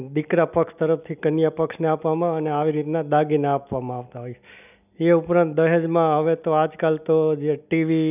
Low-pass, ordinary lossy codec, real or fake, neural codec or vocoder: 3.6 kHz; none; real; none